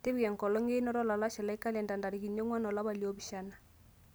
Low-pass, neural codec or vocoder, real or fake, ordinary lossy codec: none; none; real; none